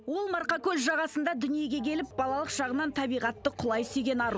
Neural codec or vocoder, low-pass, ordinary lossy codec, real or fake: none; none; none; real